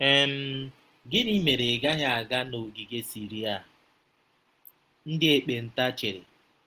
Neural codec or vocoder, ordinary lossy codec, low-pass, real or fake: none; Opus, 16 kbps; 14.4 kHz; real